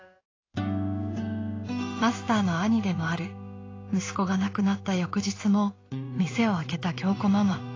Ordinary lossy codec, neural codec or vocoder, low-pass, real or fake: AAC, 32 kbps; codec, 16 kHz in and 24 kHz out, 1 kbps, XY-Tokenizer; 7.2 kHz; fake